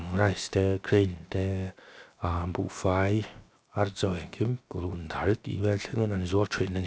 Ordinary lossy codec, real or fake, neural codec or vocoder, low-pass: none; fake; codec, 16 kHz, 0.7 kbps, FocalCodec; none